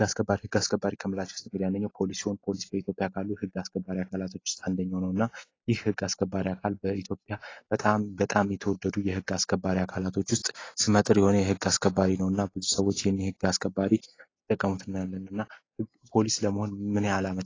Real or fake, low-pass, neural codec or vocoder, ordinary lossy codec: real; 7.2 kHz; none; AAC, 32 kbps